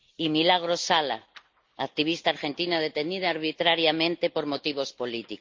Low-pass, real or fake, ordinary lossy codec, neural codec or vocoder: 7.2 kHz; real; Opus, 24 kbps; none